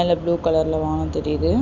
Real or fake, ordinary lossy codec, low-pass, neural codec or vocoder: real; none; 7.2 kHz; none